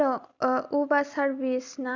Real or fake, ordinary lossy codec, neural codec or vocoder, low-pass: real; Opus, 64 kbps; none; 7.2 kHz